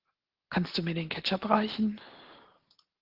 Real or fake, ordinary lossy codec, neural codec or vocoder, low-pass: real; Opus, 16 kbps; none; 5.4 kHz